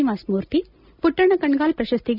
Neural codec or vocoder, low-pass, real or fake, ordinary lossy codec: none; 5.4 kHz; real; none